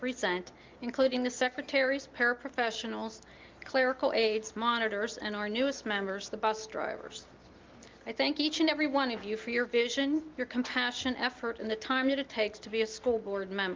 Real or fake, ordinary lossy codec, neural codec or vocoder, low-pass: real; Opus, 32 kbps; none; 7.2 kHz